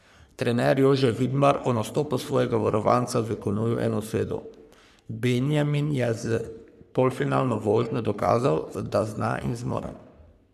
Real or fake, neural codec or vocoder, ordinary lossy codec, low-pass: fake; codec, 44.1 kHz, 3.4 kbps, Pupu-Codec; none; 14.4 kHz